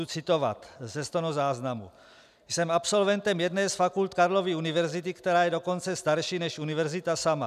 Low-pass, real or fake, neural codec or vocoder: 14.4 kHz; real; none